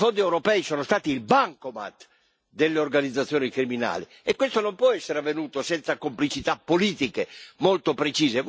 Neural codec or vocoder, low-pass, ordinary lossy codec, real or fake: none; none; none; real